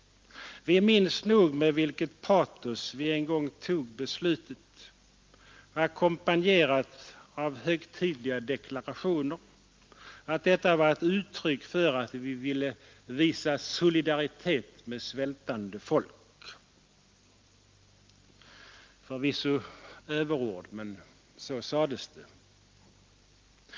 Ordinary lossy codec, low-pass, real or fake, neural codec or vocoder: Opus, 32 kbps; 7.2 kHz; real; none